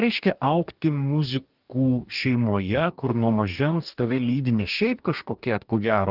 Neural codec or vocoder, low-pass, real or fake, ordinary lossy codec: codec, 44.1 kHz, 2.6 kbps, DAC; 5.4 kHz; fake; Opus, 24 kbps